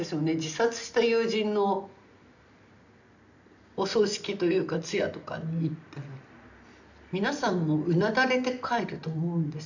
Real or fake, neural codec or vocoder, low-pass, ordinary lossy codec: real; none; 7.2 kHz; none